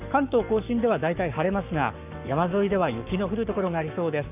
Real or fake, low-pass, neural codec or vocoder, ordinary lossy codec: fake; 3.6 kHz; codec, 44.1 kHz, 7.8 kbps, Pupu-Codec; none